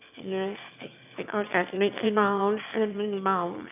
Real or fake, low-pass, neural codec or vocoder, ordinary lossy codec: fake; 3.6 kHz; autoencoder, 22.05 kHz, a latent of 192 numbers a frame, VITS, trained on one speaker; none